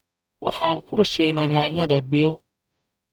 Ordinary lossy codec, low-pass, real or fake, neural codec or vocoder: none; none; fake; codec, 44.1 kHz, 0.9 kbps, DAC